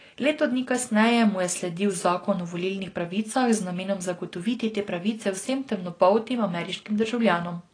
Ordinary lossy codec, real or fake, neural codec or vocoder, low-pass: AAC, 32 kbps; real; none; 9.9 kHz